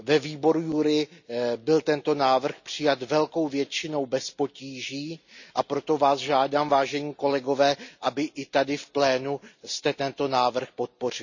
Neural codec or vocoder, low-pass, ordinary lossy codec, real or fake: none; 7.2 kHz; none; real